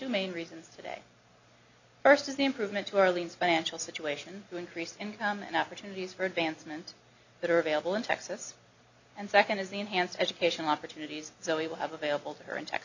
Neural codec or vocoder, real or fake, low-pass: none; real; 7.2 kHz